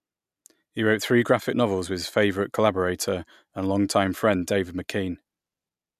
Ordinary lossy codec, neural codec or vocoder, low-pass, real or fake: MP3, 96 kbps; none; 14.4 kHz; real